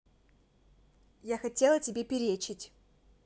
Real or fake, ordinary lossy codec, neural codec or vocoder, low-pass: real; none; none; none